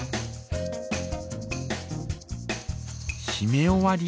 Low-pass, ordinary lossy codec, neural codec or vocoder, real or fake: none; none; none; real